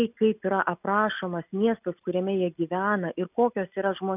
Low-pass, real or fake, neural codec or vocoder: 3.6 kHz; real; none